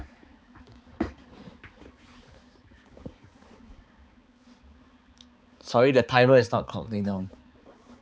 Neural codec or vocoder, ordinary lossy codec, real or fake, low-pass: codec, 16 kHz, 4 kbps, X-Codec, HuBERT features, trained on balanced general audio; none; fake; none